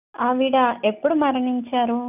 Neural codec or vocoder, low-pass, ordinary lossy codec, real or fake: none; 3.6 kHz; none; real